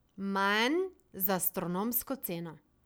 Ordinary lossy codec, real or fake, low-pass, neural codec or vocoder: none; real; none; none